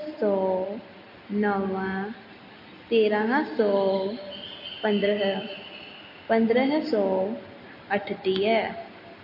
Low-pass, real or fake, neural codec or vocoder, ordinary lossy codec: 5.4 kHz; real; none; MP3, 32 kbps